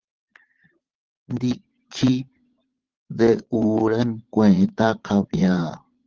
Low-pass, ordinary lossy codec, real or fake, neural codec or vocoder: 7.2 kHz; Opus, 16 kbps; fake; vocoder, 22.05 kHz, 80 mel bands, Vocos